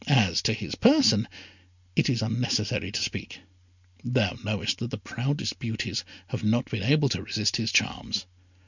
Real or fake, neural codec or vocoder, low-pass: real; none; 7.2 kHz